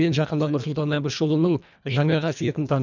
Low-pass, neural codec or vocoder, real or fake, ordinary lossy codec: 7.2 kHz; codec, 24 kHz, 1.5 kbps, HILCodec; fake; none